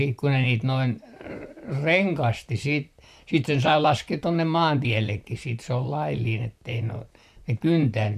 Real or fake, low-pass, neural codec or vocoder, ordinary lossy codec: fake; 14.4 kHz; vocoder, 44.1 kHz, 128 mel bands, Pupu-Vocoder; none